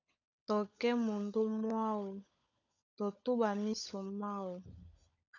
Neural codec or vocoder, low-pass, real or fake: codec, 16 kHz, 16 kbps, FunCodec, trained on LibriTTS, 50 frames a second; 7.2 kHz; fake